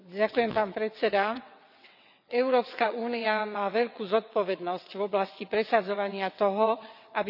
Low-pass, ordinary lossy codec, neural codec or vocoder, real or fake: 5.4 kHz; none; vocoder, 22.05 kHz, 80 mel bands, WaveNeXt; fake